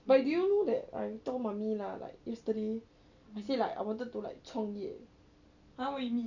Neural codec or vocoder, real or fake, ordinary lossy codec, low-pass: none; real; none; 7.2 kHz